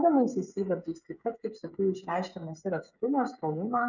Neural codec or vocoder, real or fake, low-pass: codec, 16 kHz, 16 kbps, FreqCodec, smaller model; fake; 7.2 kHz